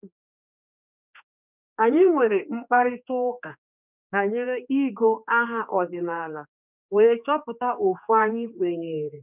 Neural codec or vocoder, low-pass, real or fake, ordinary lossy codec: codec, 16 kHz, 2 kbps, X-Codec, HuBERT features, trained on general audio; 3.6 kHz; fake; none